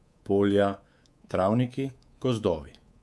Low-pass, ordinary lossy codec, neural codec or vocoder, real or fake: none; none; codec, 24 kHz, 3.1 kbps, DualCodec; fake